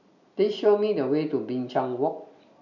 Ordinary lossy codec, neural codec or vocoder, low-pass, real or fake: none; none; 7.2 kHz; real